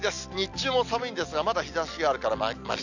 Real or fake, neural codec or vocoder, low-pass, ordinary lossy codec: real; none; 7.2 kHz; none